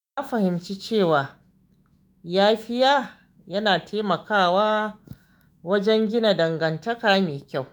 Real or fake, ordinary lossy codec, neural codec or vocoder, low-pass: fake; none; autoencoder, 48 kHz, 128 numbers a frame, DAC-VAE, trained on Japanese speech; none